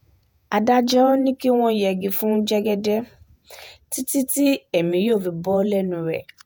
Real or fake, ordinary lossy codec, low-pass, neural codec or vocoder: fake; none; none; vocoder, 48 kHz, 128 mel bands, Vocos